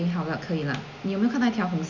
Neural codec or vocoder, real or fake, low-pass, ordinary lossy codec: none; real; 7.2 kHz; none